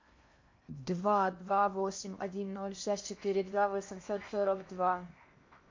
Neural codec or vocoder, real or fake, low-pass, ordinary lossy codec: codec, 16 kHz in and 24 kHz out, 0.8 kbps, FocalCodec, streaming, 65536 codes; fake; 7.2 kHz; MP3, 48 kbps